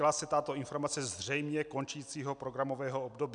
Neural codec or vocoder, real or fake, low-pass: none; real; 9.9 kHz